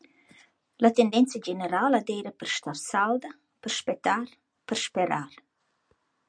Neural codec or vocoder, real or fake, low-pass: none; real; 9.9 kHz